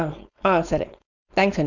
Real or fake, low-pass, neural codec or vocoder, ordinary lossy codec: fake; 7.2 kHz; codec, 16 kHz, 4.8 kbps, FACodec; none